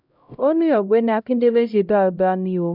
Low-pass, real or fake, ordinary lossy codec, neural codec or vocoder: 5.4 kHz; fake; none; codec, 16 kHz, 0.5 kbps, X-Codec, HuBERT features, trained on LibriSpeech